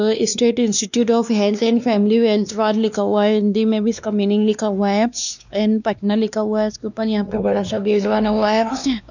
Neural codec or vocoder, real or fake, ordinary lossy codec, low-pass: codec, 16 kHz, 2 kbps, X-Codec, WavLM features, trained on Multilingual LibriSpeech; fake; none; 7.2 kHz